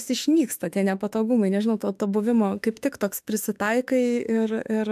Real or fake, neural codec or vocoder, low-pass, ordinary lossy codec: fake; autoencoder, 48 kHz, 32 numbers a frame, DAC-VAE, trained on Japanese speech; 14.4 kHz; AAC, 96 kbps